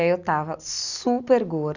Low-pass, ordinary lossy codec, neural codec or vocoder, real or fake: 7.2 kHz; none; none; real